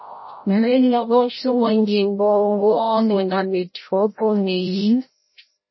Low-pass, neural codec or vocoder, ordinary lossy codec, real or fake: 7.2 kHz; codec, 16 kHz, 0.5 kbps, FreqCodec, larger model; MP3, 24 kbps; fake